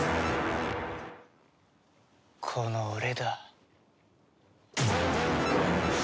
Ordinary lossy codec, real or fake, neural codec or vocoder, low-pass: none; real; none; none